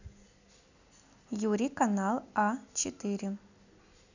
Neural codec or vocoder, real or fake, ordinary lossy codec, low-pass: none; real; none; 7.2 kHz